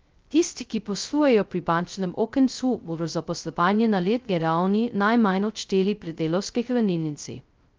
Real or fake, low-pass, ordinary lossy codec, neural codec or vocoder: fake; 7.2 kHz; Opus, 24 kbps; codec, 16 kHz, 0.2 kbps, FocalCodec